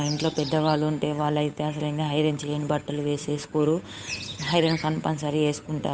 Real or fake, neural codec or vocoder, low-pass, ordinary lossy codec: fake; codec, 16 kHz, 8 kbps, FunCodec, trained on Chinese and English, 25 frames a second; none; none